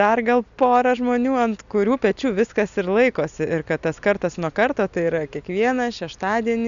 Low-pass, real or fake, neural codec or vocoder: 7.2 kHz; real; none